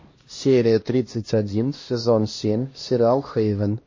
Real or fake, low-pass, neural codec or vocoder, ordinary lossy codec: fake; 7.2 kHz; codec, 16 kHz, 1 kbps, X-Codec, HuBERT features, trained on LibriSpeech; MP3, 32 kbps